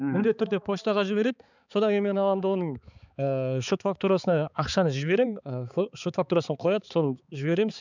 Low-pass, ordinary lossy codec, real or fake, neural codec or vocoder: 7.2 kHz; none; fake; codec, 16 kHz, 4 kbps, X-Codec, HuBERT features, trained on balanced general audio